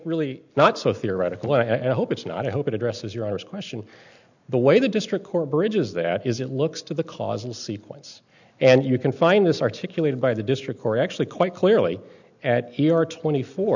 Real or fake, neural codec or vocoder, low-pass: real; none; 7.2 kHz